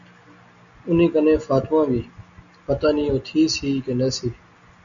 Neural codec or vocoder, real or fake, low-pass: none; real; 7.2 kHz